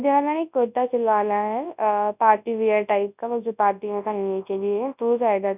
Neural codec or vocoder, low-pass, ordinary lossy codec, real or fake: codec, 24 kHz, 0.9 kbps, WavTokenizer, large speech release; 3.6 kHz; none; fake